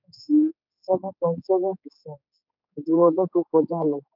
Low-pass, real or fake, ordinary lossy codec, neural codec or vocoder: 5.4 kHz; fake; none; codec, 16 kHz, 4 kbps, X-Codec, HuBERT features, trained on general audio